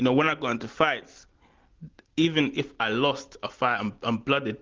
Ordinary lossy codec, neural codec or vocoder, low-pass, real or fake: Opus, 16 kbps; none; 7.2 kHz; real